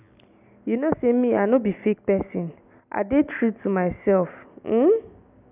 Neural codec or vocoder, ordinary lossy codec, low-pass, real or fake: none; none; 3.6 kHz; real